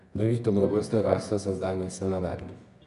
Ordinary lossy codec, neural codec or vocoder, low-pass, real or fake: none; codec, 24 kHz, 0.9 kbps, WavTokenizer, medium music audio release; 10.8 kHz; fake